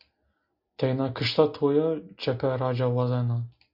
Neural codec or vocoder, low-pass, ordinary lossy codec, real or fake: none; 5.4 kHz; MP3, 48 kbps; real